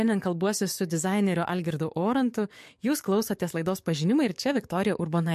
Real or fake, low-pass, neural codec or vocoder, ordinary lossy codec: fake; 14.4 kHz; codec, 44.1 kHz, 7.8 kbps, DAC; MP3, 64 kbps